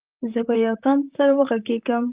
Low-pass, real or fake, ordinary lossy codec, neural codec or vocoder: 3.6 kHz; fake; Opus, 32 kbps; vocoder, 44.1 kHz, 128 mel bands, Pupu-Vocoder